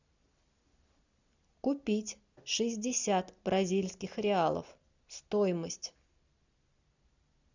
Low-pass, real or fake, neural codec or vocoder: 7.2 kHz; real; none